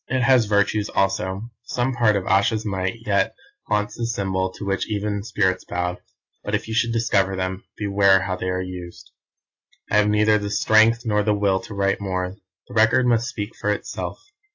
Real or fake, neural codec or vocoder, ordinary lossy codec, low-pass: real; none; AAC, 48 kbps; 7.2 kHz